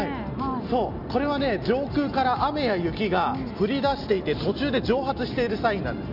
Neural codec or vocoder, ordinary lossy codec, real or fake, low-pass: none; none; real; 5.4 kHz